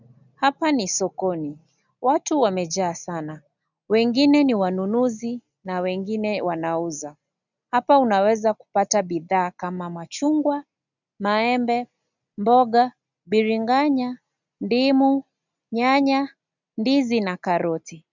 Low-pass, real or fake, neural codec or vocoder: 7.2 kHz; real; none